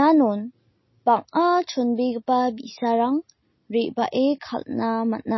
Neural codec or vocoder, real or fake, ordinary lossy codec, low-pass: none; real; MP3, 24 kbps; 7.2 kHz